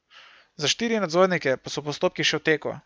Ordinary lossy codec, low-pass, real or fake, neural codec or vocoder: none; none; real; none